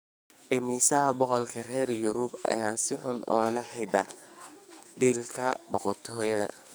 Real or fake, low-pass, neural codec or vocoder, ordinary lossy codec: fake; none; codec, 44.1 kHz, 2.6 kbps, SNAC; none